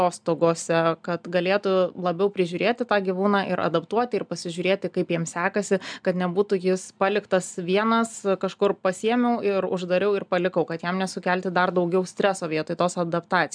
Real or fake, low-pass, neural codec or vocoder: real; 9.9 kHz; none